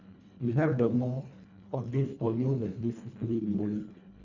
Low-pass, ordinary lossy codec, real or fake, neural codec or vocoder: 7.2 kHz; none; fake; codec, 24 kHz, 1.5 kbps, HILCodec